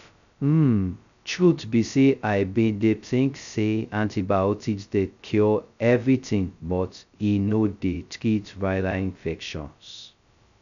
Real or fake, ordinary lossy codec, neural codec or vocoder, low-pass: fake; none; codec, 16 kHz, 0.2 kbps, FocalCodec; 7.2 kHz